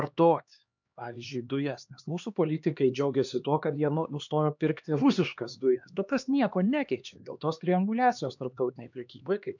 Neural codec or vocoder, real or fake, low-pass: codec, 16 kHz, 2 kbps, X-Codec, HuBERT features, trained on LibriSpeech; fake; 7.2 kHz